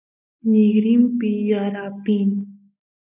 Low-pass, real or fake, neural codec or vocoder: 3.6 kHz; real; none